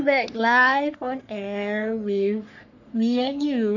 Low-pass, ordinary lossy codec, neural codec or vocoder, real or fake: 7.2 kHz; none; codec, 44.1 kHz, 3.4 kbps, Pupu-Codec; fake